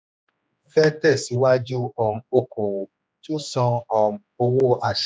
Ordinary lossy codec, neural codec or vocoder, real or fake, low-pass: none; codec, 16 kHz, 2 kbps, X-Codec, HuBERT features, trained on general audio; fake; none